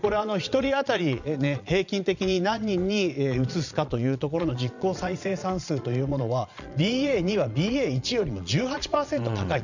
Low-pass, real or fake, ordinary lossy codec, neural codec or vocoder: 7.2 kHz; fake; none; vocoder, 22.05 kHz, 80 mel bands, Vocos